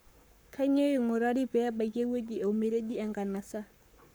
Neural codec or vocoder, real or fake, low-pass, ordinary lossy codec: codec, 44.1 kHz, 7.8 kbps, Pupu-Codec; fake; none; none